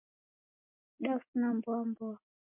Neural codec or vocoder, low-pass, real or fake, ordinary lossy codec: none; 3.6 kHz; real; MP3, 32 kbps